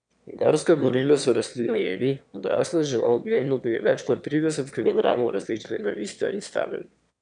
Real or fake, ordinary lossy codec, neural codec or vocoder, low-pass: fake; none; autoencoder, 22.05 kHz, a latent of 192 numbers a frame, VITS, trained on one speaker; 9.9 kHz